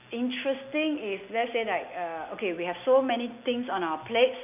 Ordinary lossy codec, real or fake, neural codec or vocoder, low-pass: none; real; none; 3.6 kHz